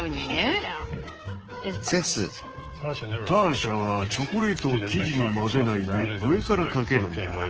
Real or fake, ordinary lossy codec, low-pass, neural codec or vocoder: fake; Opus, 16 kbps; 7.2 kHz; codec, 24 kHz, 3.1 kbps, DualCodec